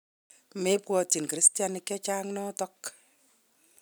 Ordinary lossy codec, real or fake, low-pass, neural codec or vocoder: none; real; none; none